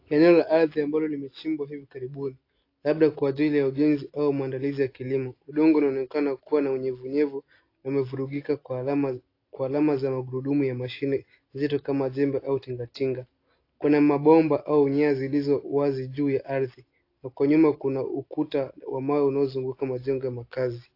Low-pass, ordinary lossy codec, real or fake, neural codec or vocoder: 5.4 kHz; AAC, 32 kbps; real; none